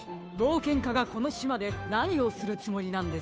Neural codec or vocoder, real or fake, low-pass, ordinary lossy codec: codec, 16 kHz, 2 kbps, FunCodec, trained on Chinese and English, 25 frames a second; fake; none; none